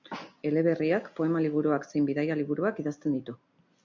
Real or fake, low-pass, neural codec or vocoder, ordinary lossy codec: real; 7.2 kHz; none; MP3, 64 kbps